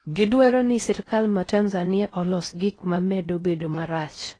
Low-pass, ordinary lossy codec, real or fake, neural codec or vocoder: 9.9 kHz; AAC, 32 kbps; fake; codec, 16 kHz in and 24 kHz out, 0.6 kbps, FocalCodec, streaming, 4096 codes